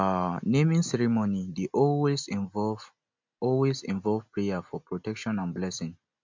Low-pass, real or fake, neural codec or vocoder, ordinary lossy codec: 7.2 kHz; real; none; MP3, 64 kbps